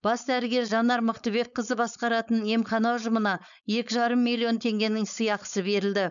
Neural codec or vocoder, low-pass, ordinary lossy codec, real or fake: codec, 16 kHz, 4.8 kbps, FACodec; 7.2 kHz; none; fake